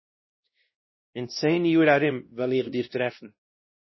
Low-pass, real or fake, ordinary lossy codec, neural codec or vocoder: 7.2 kHz; fake; MP3, 24 kbps; codec, 16 kHz, 1 kbps, X-Codec, WavLM features, trained on Multilingual LibriSpeech